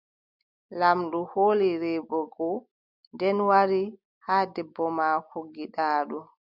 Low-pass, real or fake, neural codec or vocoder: 5.4 kHz; real; none